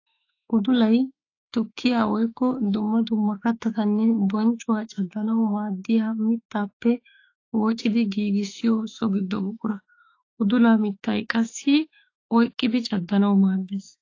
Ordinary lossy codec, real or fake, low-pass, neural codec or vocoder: AAC, 32 kbps; fake; 7.2 kHz; autoencoder, 48 kHz, 32 numbers a frame, DAC-VAE, trained on Japanese speech